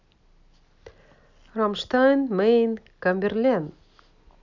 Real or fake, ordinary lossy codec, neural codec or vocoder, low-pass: real; none; none; 7.2 kHz